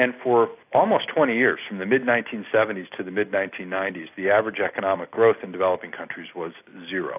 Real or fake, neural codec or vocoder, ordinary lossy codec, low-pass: real; none; AAC, 32 kbps; 3.6 kHz